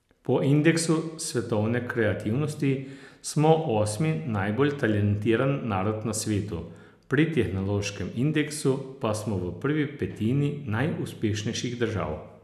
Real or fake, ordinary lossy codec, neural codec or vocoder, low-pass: real; none; none; 14.4 kHz